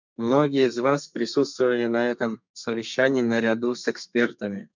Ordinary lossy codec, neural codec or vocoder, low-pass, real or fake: MP3, 64 kbps; codec, 32 kHz, 1.9 kbps, SNAC; 7.2 kHz; fake